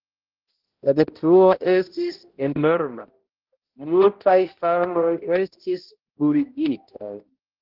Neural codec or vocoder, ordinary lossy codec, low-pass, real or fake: codec, 16 kHz, 0.5 kbps, X-Codec, HuBERT features, trained on balanced general audio; Opus, 16 kbps; 5.4 kHz; fake